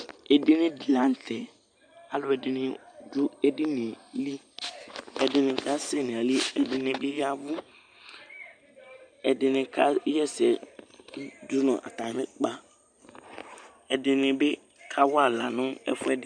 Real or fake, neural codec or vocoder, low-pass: real; none; 9.9 kHz